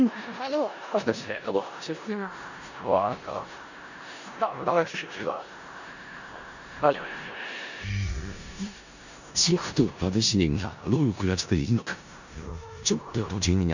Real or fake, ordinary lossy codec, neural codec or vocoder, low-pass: fake; none; codec, 16 kHz in and 24 kHz out, 0.4 kbps, LongCat-Audio-Codec, four codebook decoder; 7.2 kHz